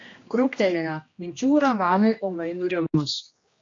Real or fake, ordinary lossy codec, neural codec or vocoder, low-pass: fake; AAC, 48 kbps; codec, 16 kHz, 1 kbps, X-Codec, HuBERT features, trained on general audio; 7.2 kHz